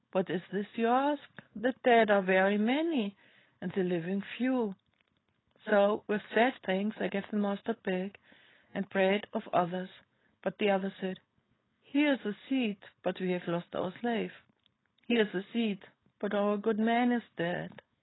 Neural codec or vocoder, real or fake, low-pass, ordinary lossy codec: codec, 16 kHz, 4.8 kbps, FACodec; fake; 7.2 kHz; AAC, 16 kbps